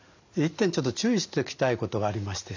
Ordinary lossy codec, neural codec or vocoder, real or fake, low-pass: none; none; real; 7.2 kHz